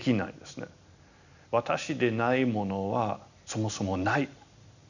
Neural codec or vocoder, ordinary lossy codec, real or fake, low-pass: none; none; real; 7.2 kHz